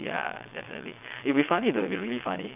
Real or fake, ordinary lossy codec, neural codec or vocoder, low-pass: fake; none; vocoder, 22.05 kHz, 80 mel bands, WaveNeXt; 3.6 kHz